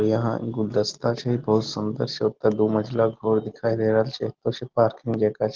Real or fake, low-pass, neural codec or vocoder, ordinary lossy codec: real; 7.2 kHz; none; Opus, 16 kbps